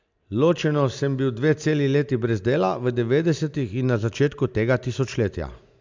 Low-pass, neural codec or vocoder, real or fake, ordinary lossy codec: 7.2 kHz; none; real; MP3, 64 kbps